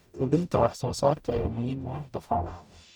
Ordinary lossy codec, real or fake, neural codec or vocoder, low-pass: none; fake; codec, 44.1 kHz, 0.9 kbps, DAC; 19.8 kHz